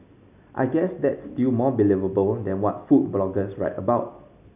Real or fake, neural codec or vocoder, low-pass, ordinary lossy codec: real; none; 3.6 kHz; none